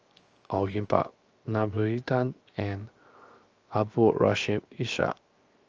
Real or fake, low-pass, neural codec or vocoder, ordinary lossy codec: fake; 7.2 kHz; codec, 16 kHz, 0.7 kbps, FocalCodec; Opus, 24 kbps